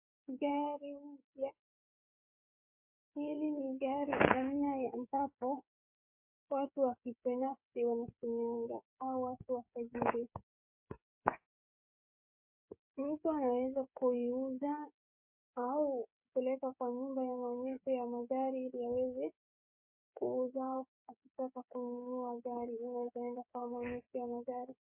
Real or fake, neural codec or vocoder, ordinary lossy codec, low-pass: fake; codec, 16 kHz, 8 kbps, FreqCodec, smaller model; MP3, 32 kbps; 3.6 kHz